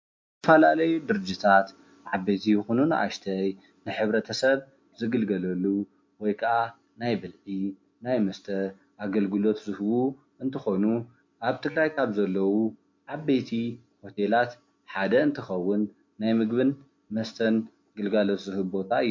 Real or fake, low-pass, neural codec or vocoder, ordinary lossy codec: real; 7.2 kHz; none; MP3, 48 kbps